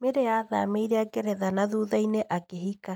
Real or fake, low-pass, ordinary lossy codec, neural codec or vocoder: real; 19.8 kHz; none; none